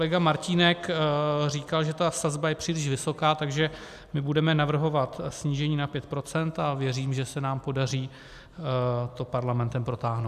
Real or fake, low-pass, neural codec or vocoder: real; 14.4 kHz; none